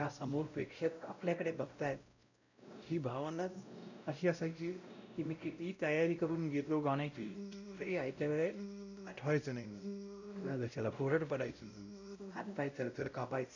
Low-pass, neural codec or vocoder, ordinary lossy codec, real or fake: 7.2 kHz; codec, 16 kHz, 0.5 kbps, X-Codec, WavLM features, trained on Multilingual LibriSpeech; none; fake